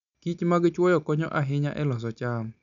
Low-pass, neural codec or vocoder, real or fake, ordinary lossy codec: 7.2 kHz; none; real; none